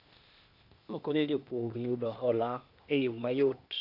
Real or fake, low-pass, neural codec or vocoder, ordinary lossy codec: fake; 5.4 kHz; codec, 16 kHz, 0.8 kbps, ZipCodec; none